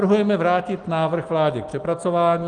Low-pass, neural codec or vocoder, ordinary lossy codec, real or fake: 9.9 kHz; none; Opus, 24 kbps; real